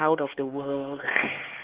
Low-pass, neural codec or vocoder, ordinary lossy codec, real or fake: 3.6 kHz; codec, 16 kHz, 4 kbps, X-Codec, HuBERT features, trained on LibriSpeech; Opus, 16 kbps; fake